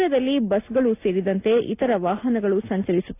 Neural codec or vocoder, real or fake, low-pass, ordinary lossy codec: none; real; 3.6 kHz; AAC, 24 kbps